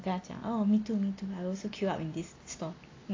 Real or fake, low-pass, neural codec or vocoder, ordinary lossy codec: real; 7.2 kHz; none; AAC, 32 kbps